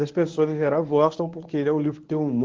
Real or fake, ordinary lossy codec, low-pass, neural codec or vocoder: fake; Opus, 32 kbps; 7.2 kHz; codec, 24 kHz, 0.9 kbps, WavTokenizer, medium speech release version 1